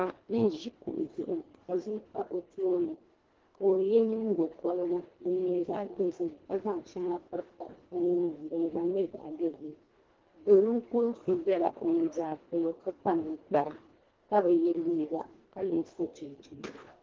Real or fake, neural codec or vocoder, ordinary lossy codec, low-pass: fake; codec, 24 kHz, 1.5 kbps, HILCodec; Opus, 16 kbps; 7.2 kHz